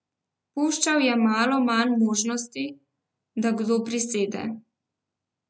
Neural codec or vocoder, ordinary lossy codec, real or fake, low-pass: none; none; real; none